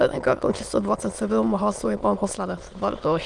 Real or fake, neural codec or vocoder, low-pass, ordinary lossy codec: fake; autoencoder, 22.05 kHz, a latent of 192 numbers a frame, VITS, trained on many speakers; 9.9 kHz; Opus, 24 kbps